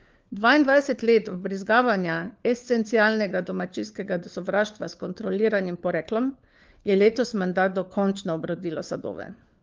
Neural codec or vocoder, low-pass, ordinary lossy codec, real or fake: codec, 16 kHz, 4 kbps, FunCodec, trained on LibriTTS, 50 frames a second; 7.2 kHz; Opus, 32 kbps; fake